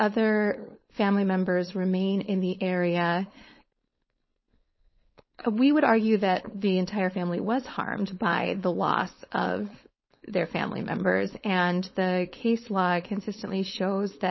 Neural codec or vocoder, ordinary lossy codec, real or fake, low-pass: codec, 16 kHz, 4.8 kbps, FACodec; MP3, 24 kbps; fake; 7.2 kHz